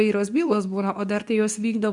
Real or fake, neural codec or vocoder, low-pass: fake; codec, 24 kHz, 0.9 kbps, WavTokenizer, medium speech release version 2; 10.8 kHz